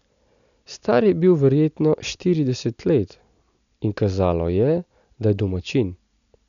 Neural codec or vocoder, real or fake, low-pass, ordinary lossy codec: none; real; 7.2 kHz; none